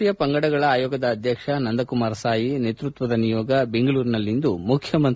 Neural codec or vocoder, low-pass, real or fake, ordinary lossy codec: none; none; real; none